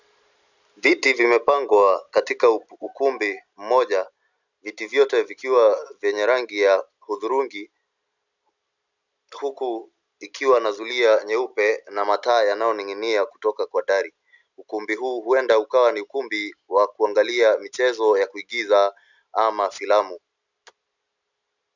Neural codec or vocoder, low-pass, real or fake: none; 7.2 kHz; real